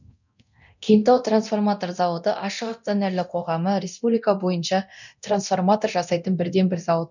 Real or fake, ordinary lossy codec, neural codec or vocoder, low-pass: fake; none; codec, 24 kHz, 0.9 kbps, DualCodec; 7.2 kHz